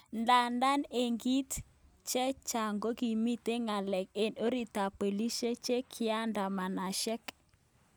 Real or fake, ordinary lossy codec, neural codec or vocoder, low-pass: real; none; none; none